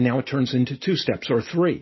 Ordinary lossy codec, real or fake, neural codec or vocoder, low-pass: MP3, 24 kbps; real; none; 7.2 kHz